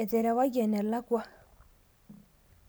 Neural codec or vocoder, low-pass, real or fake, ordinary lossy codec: none; none; real; none